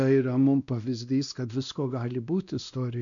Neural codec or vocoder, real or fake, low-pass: codec, 16 kHz, 1 kbps, X-Codec, WavLM features, trained on Multilingual LibriSpeech; fake; 7.2 kHz